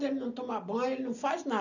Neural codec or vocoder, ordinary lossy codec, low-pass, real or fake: none; none; 7.2 kHz; real